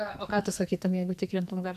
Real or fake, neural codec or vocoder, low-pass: fake; codec, 44.1 kHz, 2.6 kbps, SNAC; 14.4 kHz